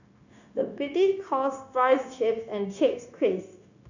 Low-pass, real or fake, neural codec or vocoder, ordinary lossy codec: 7.2 kHz; fake; codec, 16 kHz, 0.9 kbps, LongCat-Audio-Codec; none